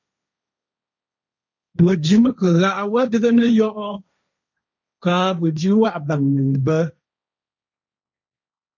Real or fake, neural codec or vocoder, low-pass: fake; codec, 16 kHz, 1.1 kbps, Voila-Tokenizer; 7.2 kHz